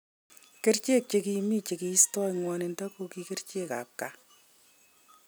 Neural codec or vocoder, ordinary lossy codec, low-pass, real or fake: none; none; none; real